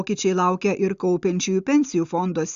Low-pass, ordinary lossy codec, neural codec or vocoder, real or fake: 7.2 kHz; Opus, 64 kbps; none; real